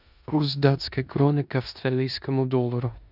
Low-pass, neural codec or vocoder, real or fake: 5.4 kHz; codec, 16 kHz in and 24 kHz out, 0.9 kbps, LongCat-Audio-Codec, four codebook decoder; fake